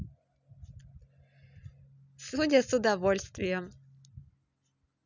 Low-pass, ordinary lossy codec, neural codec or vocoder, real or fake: 7.2 kHz; none; none; real